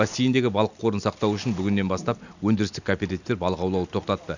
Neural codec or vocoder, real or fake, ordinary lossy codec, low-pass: none; real; none; 7.2 kHz